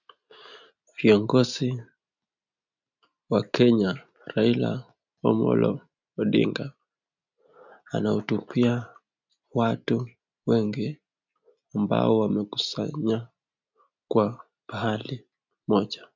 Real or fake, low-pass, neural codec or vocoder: real; 7.2 kHz; none